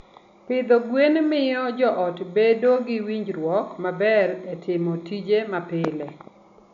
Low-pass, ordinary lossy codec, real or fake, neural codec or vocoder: 7.2 kHz; MP3, 96 kbps; real; none